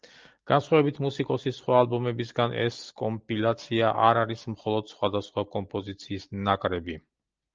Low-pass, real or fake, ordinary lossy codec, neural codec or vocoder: 7.2 kHz; real; Opus, 24 kbps; none